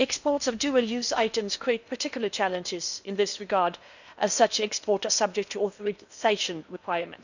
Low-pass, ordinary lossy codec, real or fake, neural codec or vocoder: 7.2 kHz; none; fake; codec, 16 kHz in and 24 kHz out, 0.8 kbps, FocalCodec, streaming, 65536 codes